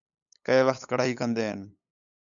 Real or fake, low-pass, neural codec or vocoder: fake; 7.2 kHz; codec, 16 kHz, 8 kbps, FunCodec, trained on LibriTTS, 25 frames a second